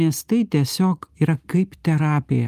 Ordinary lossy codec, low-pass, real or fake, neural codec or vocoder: Opus, 32 kbps; 14.4 kHz; real; none